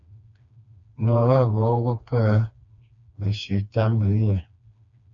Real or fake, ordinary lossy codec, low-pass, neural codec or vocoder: fake; AAC, 64 kbps; 7.2 kHz; codec, 16 kHz, 2 kbps, FreqCodec, smaller model